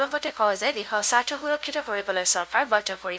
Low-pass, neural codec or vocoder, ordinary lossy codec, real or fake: none; codec, 16 kHz, 0.5 kbps, FunCodec, trained on LibriTTS, 25 frames a second; none; fake